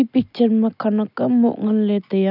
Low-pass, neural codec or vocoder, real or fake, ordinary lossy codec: 5.4 kHz; none; real; none